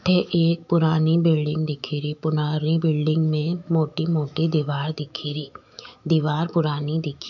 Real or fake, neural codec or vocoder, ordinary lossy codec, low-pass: fake; autoencoder, 48 kHz, 128 numbers a frame, DAC-VAE, trained on Japanese speech; none; 7.2 kHz